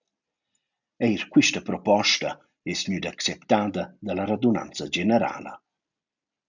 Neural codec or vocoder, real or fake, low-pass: none; real; 7.2 kHz